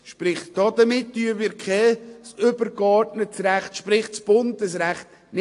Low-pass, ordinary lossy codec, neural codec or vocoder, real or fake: 10.8 kHz; AAC, 48 kbps; none; real